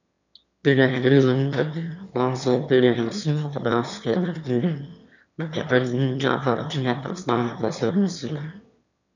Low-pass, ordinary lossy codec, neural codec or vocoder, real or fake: 7.2 kHz; none; autoencoder, 22.05 kHz, a latent of 192 numbers a frame, VITS, trained on one speaker; fake